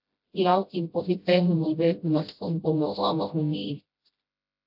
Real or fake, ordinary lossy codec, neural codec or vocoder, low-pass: fake; AAC, 48 kbps; codec, 16 kHz, 0.5 kbps, FreqCodec, smaller model; 5.4 kHz